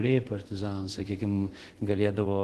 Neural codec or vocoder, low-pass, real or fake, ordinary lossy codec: codec, 24 kHz, 0.5 kbps, DualCodec; 10.8 kHz; fake; Opus, 16 kbps